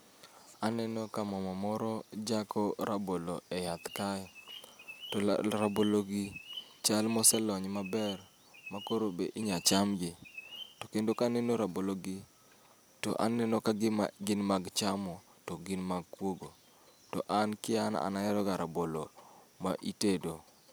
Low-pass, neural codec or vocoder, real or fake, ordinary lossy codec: none; none; real; none